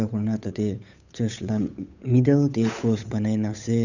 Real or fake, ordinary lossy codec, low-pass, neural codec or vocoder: fake; none; 7.2 kHz; codec, 16 kHz, 8 kbps, FunCodec, trained on Chinese and English, 25 frames a second